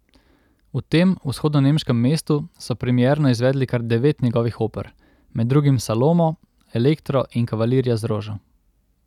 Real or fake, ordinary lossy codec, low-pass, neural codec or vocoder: real; none; 19.8 kHz; none